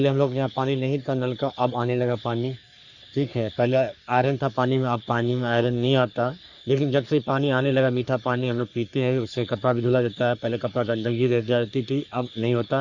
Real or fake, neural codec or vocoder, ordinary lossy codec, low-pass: fake; codec, 44.1 kHz, 3.4 kbps, Pupu-Codec; Opus, 64 kbps; 7.2 kHz